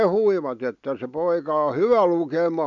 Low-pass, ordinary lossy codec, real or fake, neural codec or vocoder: 7.2 kHz; none; real; none